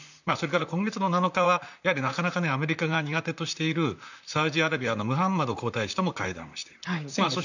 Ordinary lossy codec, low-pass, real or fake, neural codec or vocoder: none; 7.2 kHz; fake; vocoder, 44.1 kHz, 128 mel bands, Pupu-Vocoder